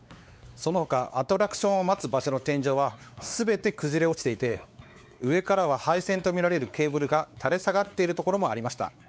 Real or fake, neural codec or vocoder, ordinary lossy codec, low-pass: fake; codec, 16 kHz, 4 kbps, X-Codec, WavLM features, trained on Multilingual LibriSpeech; none; none